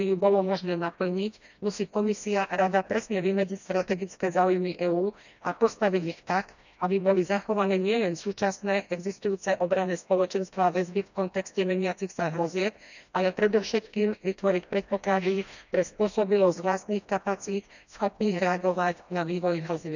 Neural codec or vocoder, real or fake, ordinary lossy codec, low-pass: codec, 16 kHz, 1 kbps, FreqCodec, smaller model; fake; none; 7.2 kHz